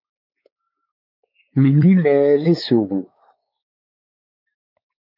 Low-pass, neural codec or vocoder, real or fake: 5.4 kHz; codec, 16 kHz, 4 kbps, X-Codec, WavLM features, trained on Multilingual LibriSpeech; fake